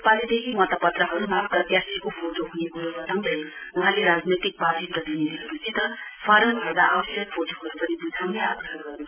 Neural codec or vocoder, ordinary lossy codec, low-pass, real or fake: none; none; 3.6 kHz; real